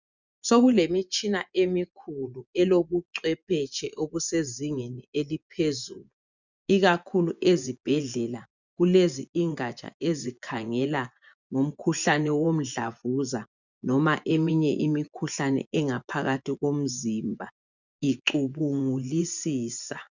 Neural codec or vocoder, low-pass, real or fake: vocoder, 24 kHz, 100 mel bands, Vocos; 7.2 kHz; fake